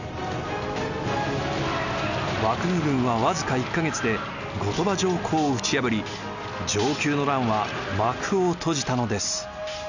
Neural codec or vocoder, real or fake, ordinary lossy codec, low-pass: none; real; none; 7.2 kHz